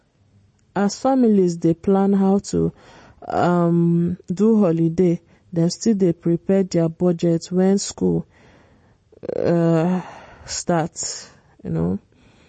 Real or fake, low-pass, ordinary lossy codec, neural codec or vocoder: real; 10.8 kHz; MP3, 32 kbps; none